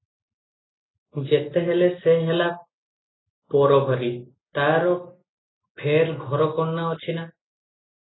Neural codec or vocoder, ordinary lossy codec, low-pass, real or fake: none; AAC, 16 kbps; 7.2 kHz; real